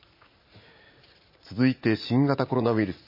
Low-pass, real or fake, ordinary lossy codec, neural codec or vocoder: 5.4 kHz; fake; MP3, 24 kbps; vocoder, 44.1 kHz, 80 mel bands, Vocos